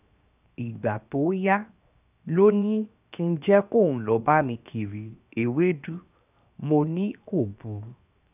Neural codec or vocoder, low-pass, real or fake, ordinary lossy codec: codec, 16 kHz, 0.7 kbps, FocalCodec; 3.6 kHz; fake; none